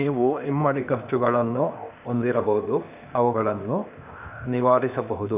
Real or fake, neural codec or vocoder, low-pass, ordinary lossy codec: fake; codec, 16 kHz, 0.8 kbps, ZipCodec; 3.6 kHz; none